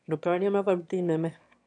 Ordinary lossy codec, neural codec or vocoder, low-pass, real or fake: none; autoencoder, 22.05 kHz, a latent of 192 numbers a frame, VITS, trained on one speaker; 9.9 kHz; fake